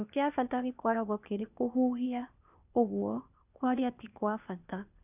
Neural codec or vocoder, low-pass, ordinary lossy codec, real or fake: codec, 16 kHz, 0.8 kbps, ZipCodec; 3.6 kHz; none; fake